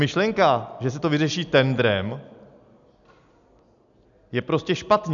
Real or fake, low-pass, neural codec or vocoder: real; 7.2 kHz; none